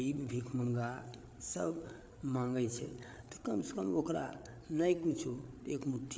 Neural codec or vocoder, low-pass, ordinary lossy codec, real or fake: codec, 16 kHz, 8 kbps, FreqCodec, larger model; none; none; fake